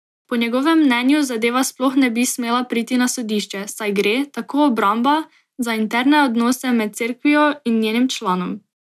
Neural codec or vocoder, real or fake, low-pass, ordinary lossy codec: none; real; 14.4 kHz; none